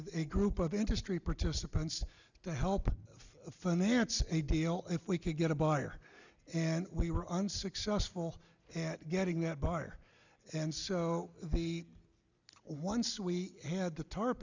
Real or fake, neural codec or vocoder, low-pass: real; none; 7.2 kHz